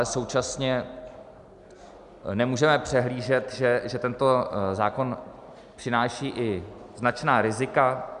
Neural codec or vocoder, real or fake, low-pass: none; real; 10.8 kHz